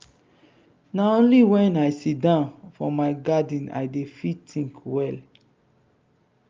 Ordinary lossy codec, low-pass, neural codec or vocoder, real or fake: Opus, 32 kbps; 7.2 kHz; none; real